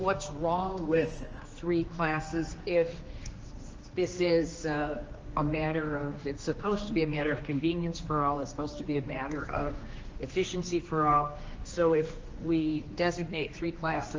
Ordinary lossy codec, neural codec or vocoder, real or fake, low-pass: Opus, 24 kbps; codec, 16 kHz, 2 kbps, X-Codec, HuBERT features, trained on general audio; fake; 7.2 kHz